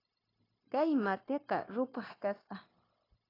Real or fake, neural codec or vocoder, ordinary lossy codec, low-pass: fake; codec, 16 kHz, 0.9 kbps, LongCat-Audio-Codec; AAC, 24 kbps; 5.4 kHz